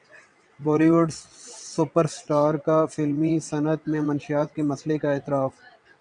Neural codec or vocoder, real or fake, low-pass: vocoder, 22.05 kHz, 80 mel bands, WaveNeXt; fake; 9.9 kHz